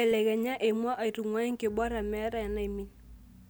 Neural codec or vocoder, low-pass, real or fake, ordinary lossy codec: none; none; real; none